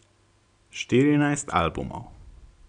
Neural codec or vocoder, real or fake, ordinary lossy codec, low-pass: none; real; none; 9.9 kHz